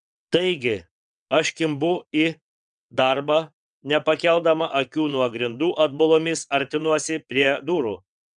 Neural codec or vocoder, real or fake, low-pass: vocoder, 22.05 kHz, 80 mel bands, WaveNeXt; fake; 9.9 kHz